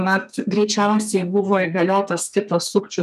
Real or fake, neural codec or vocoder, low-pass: fake; codec, 32 kHz, 1.9 kbps, SNAC; 14.4 kHz